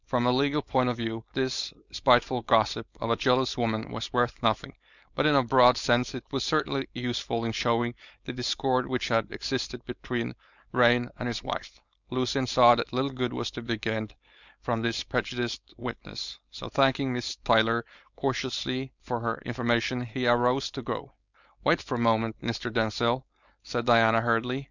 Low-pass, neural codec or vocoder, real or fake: 7.2 kHz; codec, 16 kHz, 4.8 kbps, FACodec; fake